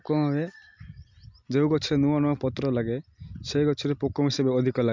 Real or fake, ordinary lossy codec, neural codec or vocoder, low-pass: real; MP3, 64 kbps; none; 7.2 kHz